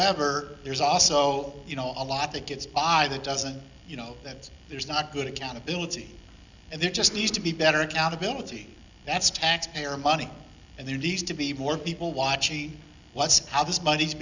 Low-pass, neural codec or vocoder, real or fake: 7.2 kHz; none; real